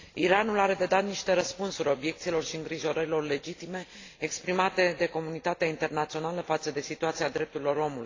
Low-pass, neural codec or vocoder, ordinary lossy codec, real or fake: 7.2 kHz; none; AAC, 32 kbps; real